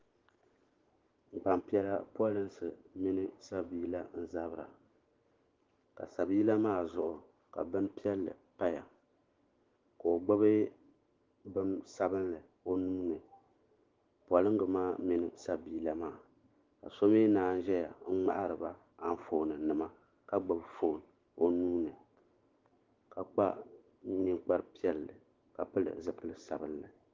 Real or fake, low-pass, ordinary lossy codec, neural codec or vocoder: real; 7.2 kHz; Opus, 16 kbps; none